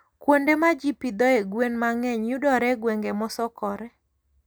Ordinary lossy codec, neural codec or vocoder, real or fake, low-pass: none; none; real; none